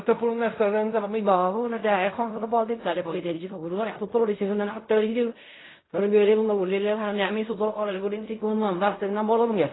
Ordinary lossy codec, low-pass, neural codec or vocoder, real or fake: AAC, 16 kbps; 7.2 kHz; codec, 16 kHz in and 24 kHz out, 0.4 kbps, LongCat-Audio-Codec, fine tuned four codebook decoder; fake